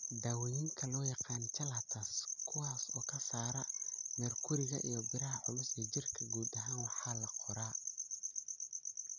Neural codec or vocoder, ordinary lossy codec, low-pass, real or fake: none; none; 7.2 kHz; real